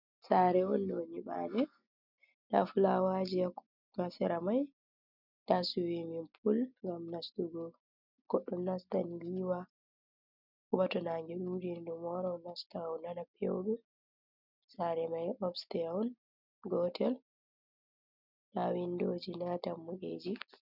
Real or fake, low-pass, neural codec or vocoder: real; 5.4 kHz; none